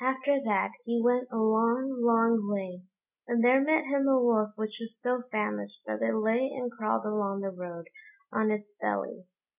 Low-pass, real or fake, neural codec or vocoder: 3.6 kHz; real; none